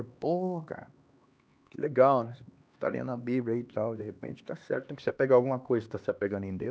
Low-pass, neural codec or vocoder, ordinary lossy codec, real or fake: none; codec, 16 kHz, 2 kbps, X-Codec, HuBERT features, trained on LibriSpeech; none; fake